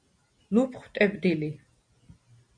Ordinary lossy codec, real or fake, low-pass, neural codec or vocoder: MP3, 96 kbps; real; 9.9 kHz; none